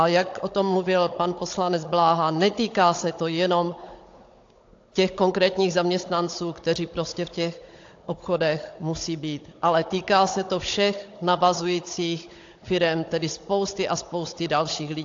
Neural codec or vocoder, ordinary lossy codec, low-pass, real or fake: codec, 16 kHz, 16 kbps, FunCodec, trained on Chinese and English, 50 frames a second; AAC, 48 kbps; 7.2 kHz; fake